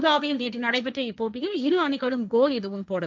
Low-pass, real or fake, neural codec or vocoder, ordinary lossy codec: none; fake; codec, 16 kHz, 1.1 kbps, Voila-Tokenizer; none